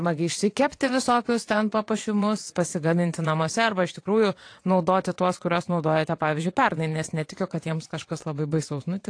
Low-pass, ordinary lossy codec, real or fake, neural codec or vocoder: 9.9 kHz; AAC, 48 kbps; fake; vocoder, 22.05 kHz, 80 mel bands, WaveNeXt